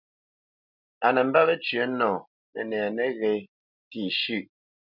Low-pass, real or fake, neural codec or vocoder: 5.4 kHz; real; none